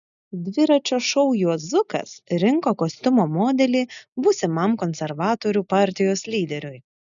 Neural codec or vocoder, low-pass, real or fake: none; 7.2 kHz; real